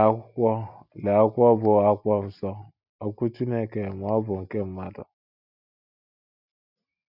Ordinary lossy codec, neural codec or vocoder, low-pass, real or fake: none; none; 5.4 kHz; real